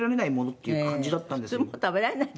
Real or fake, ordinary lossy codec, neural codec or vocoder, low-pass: real; none; none; none